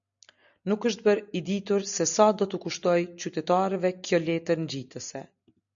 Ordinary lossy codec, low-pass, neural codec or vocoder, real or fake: MP3, 96 kbps; 7.2 kHz; none; real